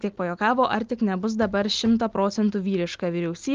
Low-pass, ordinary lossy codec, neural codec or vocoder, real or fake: 7.2 kHz; Opus, 32 kbps; none; real